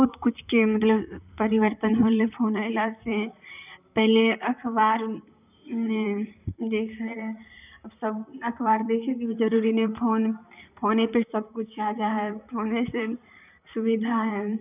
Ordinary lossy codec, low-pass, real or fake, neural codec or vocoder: none; 3.6 kHz; fake; vocoder, 44.1 kHz, 128 mel bands, Pupu-Vocoder